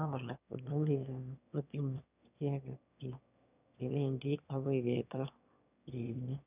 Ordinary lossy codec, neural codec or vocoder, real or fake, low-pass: none; autoencoder, 22.05 kHz, a latent of 192 numbers a frame, VITS, trained on one speaker; fake; 3.6 kHz